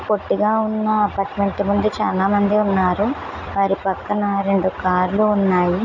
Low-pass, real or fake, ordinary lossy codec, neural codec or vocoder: 7.2 kHz; real; none; none